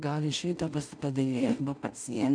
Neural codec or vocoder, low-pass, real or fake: codec, 16 kHz in and 24 kHz out, 0.4 kbps, LongCat-Audio-Codec, two codebook decoder; 9.9 kHz; fake